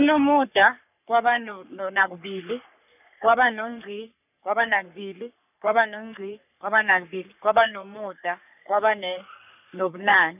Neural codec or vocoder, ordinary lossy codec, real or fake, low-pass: codec, 44.1 kHz, 3.4 kbps, Pupu-Codec; none; fake; 3.6 kHz